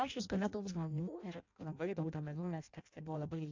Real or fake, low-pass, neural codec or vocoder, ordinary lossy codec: fake; 7.2 kHz; codec, 16 kHz in and 24 kHz out, 0.6 kbps, FireRedTTS-2 codec; AAC, 48 kbps